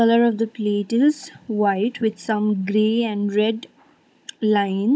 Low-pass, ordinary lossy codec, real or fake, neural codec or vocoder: none; none; fake; codec, 16 kHz, 16 kbps, FunCodec, trained on Chinese and English, 50 frames a second